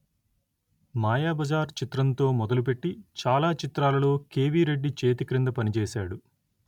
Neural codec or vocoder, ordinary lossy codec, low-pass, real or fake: none; none; 19.8 kHz; real